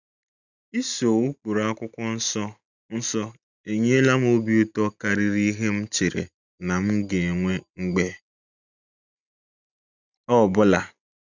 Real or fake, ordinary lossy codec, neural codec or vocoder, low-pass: real; none; none; 7.2 kHz